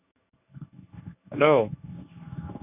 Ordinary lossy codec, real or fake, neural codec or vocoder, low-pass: none; fake; codec, 24 kHz, 0.9 kbps, WavTokenizer, medium speech release version 1; 3.6 kHz